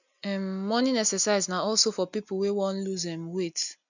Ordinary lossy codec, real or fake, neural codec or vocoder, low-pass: none; real; none; 7.2 kHz